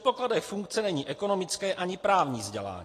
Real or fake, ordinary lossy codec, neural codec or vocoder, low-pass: real; AAC, 48 kbps; none; 14.4 kHz